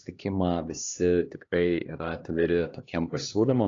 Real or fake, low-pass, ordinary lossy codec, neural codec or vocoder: fake; 7.2 kHz; AAC, 32 kbps; codec, 16 kHz, 2 kbps, X-Codec, HuBERT features, trained on LibriSpeech